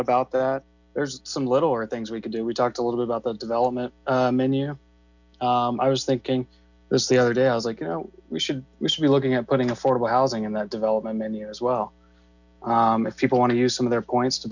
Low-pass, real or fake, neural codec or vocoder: 7.2 kHz; real; none